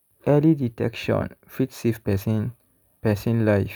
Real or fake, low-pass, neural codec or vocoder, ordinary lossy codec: real; none; none; none